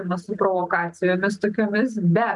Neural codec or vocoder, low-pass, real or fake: none; 10.8 kHz; real